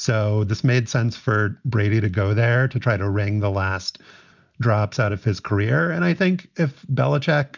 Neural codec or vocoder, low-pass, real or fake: none; 7.2 kHz; real